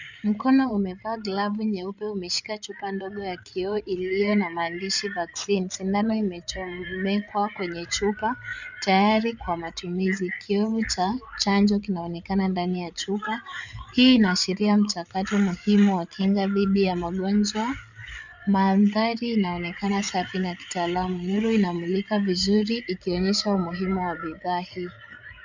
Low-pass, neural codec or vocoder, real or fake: 7.2 kHz; codec, 16 kHz, 8 kbps, FreqCodec, larger model; fake